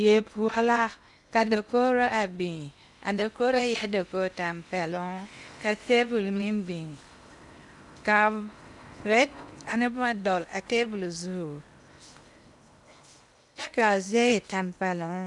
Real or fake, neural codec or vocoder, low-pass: fake; codec, 16 kHz in and 24 kHz out, 0.8 kbps, FocalCodec, streaming, 65536 codes; 10.8 kHz